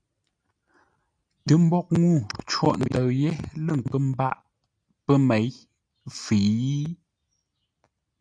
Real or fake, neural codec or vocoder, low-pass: real; none; 9.9 kHz